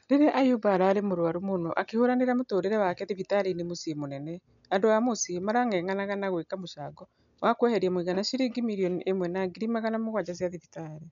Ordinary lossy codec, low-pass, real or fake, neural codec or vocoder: none; 7.2 kHz; real; none